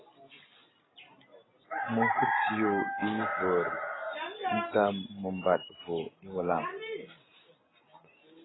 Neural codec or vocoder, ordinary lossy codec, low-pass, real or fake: none; AAC, 16 kbps; 7.2 kHz; real